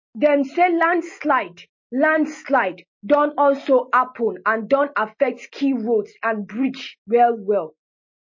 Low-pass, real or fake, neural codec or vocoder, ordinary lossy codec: 7.2 kHz; real; none; MP3, 32 kbps